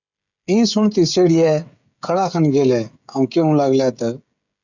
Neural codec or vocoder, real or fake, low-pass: codec, 16 kHz, 16 kbps, FreqCodec, smaller model; fake; 7.2 kHz